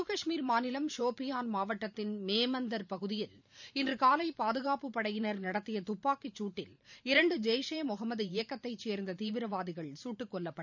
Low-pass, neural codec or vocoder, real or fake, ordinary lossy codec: 7.2 kHz; vocoder, 44.1 kHz, 128 mel bands every 512 samples, BigVGAN v2; fake; none